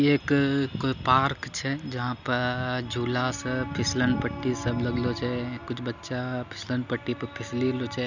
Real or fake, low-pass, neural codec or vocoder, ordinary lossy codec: real; 7.2 kHz; none; none